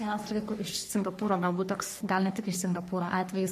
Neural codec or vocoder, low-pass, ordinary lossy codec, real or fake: codec, 44.1 kHz, 3.4 kbps, Pupu-Codec; 14.4 kHz; MP3, 64 kbps; fake